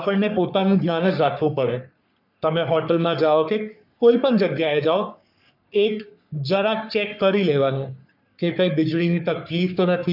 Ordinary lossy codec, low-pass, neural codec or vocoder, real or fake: none; 5.4 kHz; codec, 44.1 kHz, 3.4 kbps, Pupu-Codec; fake